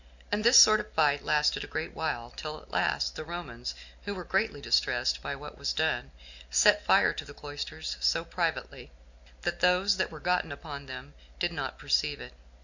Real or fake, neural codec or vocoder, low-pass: real; none; 7.2 kHz